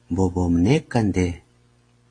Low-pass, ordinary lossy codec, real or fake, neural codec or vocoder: 9.9 kHz; AAC, 32 kbps; real; none